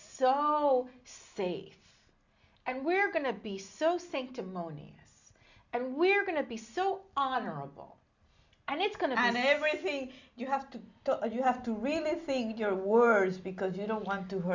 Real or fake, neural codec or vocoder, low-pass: real; none; 7.2 kHz